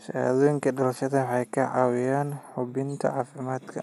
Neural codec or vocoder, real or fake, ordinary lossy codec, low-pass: none; real; none; 14.4 kHz